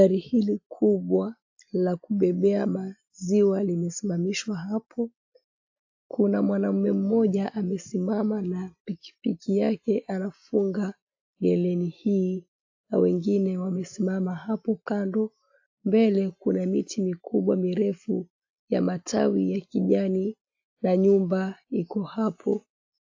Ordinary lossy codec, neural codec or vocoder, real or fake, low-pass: AAC, 48 kbps; none; real; 7.2 kHz